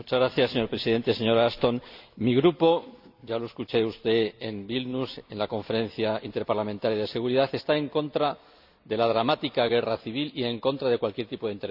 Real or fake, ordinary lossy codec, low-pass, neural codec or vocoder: real; none; 5.4 kHz; none